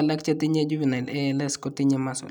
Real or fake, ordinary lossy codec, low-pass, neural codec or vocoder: real; none; 19.8 kHz; none